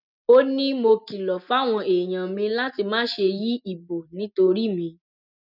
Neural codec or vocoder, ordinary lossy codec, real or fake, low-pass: none; none; real; 5.4 kHz